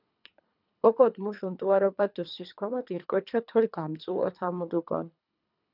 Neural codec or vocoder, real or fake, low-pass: codec, 24 kHz, 3 kbps, HILCodec; fake; 5.4 kHz